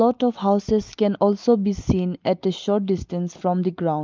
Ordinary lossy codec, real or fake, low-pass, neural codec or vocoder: Opus, 32 kbps; real; 7.2 kHz; none